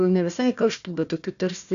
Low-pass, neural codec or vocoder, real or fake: 7.2 kHz; codec, 16 kHz, 1 kbps, FunCodec, trained on Chinese and English, 50 frames a second; fake